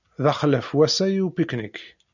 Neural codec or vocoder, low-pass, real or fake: none; 7.2 kHz; real